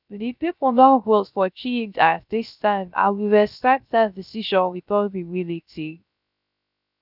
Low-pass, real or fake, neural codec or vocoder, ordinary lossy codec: 5.4 kHz; fake; codec, 16 kHz, 0.3 kbps, FocalCodec; none